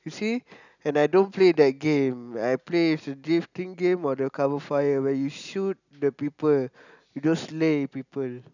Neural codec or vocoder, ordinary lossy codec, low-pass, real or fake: none; none; 7.2 kHz; real